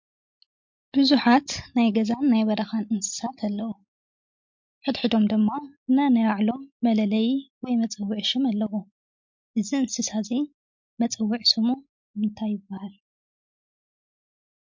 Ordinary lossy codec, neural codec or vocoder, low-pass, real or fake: MP3, 48 kbps; none; 7.2 kHz; real